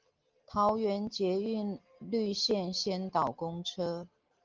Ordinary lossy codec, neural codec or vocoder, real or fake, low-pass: Opus, 32 kbps; none; real; 7.2 kHz